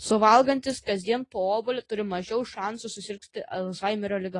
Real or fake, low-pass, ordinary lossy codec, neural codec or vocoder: real; 10.8 kHz; AAC, 32 kbps; none